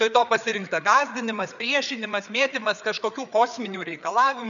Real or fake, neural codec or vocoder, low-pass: fake; codec, 16 kHz, 4 kbps, FreqCodec, larger model; 7.2 kHz